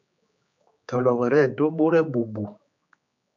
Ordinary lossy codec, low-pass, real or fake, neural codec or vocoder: MP3, 64 kbps; 7.2 kHz; fake; codec, 16 kHz, 4 kbps, X-Codec, HuBERT features, trained on general audio